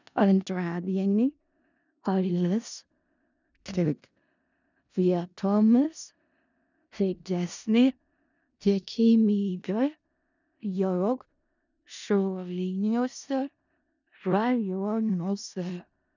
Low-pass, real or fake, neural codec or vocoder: 7.2 kHz; fake; codec, 16 kHz in and 24 kHz out, 0.4 kbps, LongCat-Audio-Codec, four codebook decoder